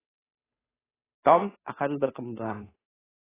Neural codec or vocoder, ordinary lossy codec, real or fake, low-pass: codec, 16 kHz, 2 kbps, FunCodec, trained on Chinese and English, 25 frames a second; AAC, 16 kbps; fake; 3.6 kHz